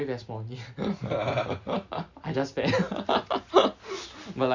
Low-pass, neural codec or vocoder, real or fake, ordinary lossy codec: 7.2 kHz; none; real; Opus, 64 kbps